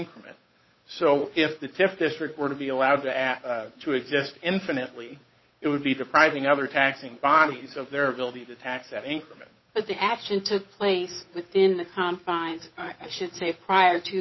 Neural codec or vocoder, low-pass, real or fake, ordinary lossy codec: codec, 16 kHz, 8 kbps, FunCodec, trained on Chinese and English, 25 frames a second; 7.2 kHz; fake; MP3, 24 kbps